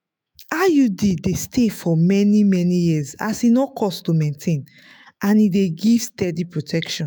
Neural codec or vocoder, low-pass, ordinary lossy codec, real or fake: autoencoder, 48 kHz, 128 numbers a frame, DAC-VAE, trained on Japanese speech; none; none; fake